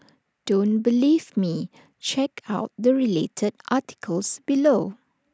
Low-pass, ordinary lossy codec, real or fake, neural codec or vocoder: none; none; real; none